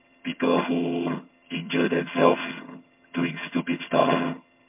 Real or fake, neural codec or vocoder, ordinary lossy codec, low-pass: fake; vocoder, 22.05 kHz, 80 mel bands, HiFi-GAN; MP3, 32 kbps; 3.6 kHz